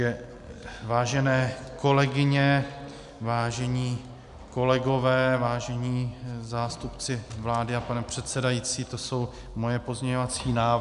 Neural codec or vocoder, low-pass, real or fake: none; 10.8 kHz; real